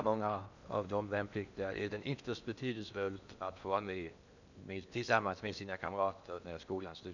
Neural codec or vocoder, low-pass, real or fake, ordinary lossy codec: codec, 16 kHz in and 24 kHz out, 0.8 kbps, FocalCodec, streaming, 65536 codes; 7.2 kHz; fake; none